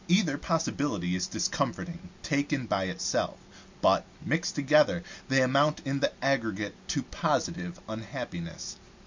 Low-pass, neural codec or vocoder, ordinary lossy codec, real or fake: 7.2 kHz; none; MP3, 64 kbps; real